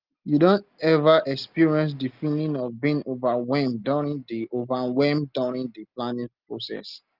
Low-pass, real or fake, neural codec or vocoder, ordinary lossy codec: 5.4 kHz; real; none; Opus, 24 kbps